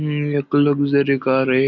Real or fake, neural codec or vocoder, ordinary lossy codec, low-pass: real; none; none; 7.2 kHz